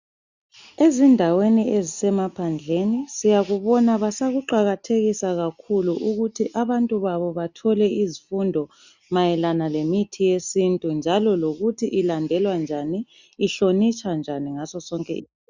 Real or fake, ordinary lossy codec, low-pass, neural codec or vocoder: real; Opus, 64 kbps; 7.2 kHz; none